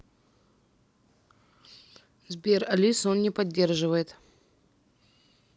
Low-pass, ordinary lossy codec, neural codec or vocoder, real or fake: none; none; none; real